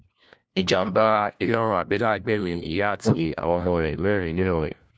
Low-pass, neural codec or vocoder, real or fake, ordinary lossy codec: none; codec, 16 kHz, 1 kbps, FunCodec, trained on LibriTTS, 50 frames a second; fake; none